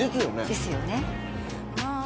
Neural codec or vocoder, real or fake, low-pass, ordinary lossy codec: none; real; none; none